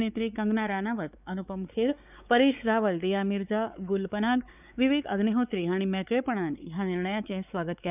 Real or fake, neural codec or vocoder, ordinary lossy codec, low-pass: fake; codec, 16 kHz, 4 kbps, X-Codec, WavLM features, trained on Multilingual LibriSpeech; none; 3.6 kHz